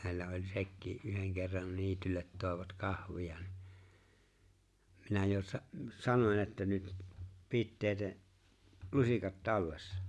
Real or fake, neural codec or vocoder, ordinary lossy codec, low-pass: real; none; none; none